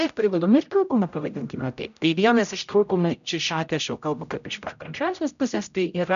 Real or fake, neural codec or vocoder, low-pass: fake; codec, 16 kHz, 0.5 kbps, X-Codec, HuBERT features, trained on general audio; 7.2 kHz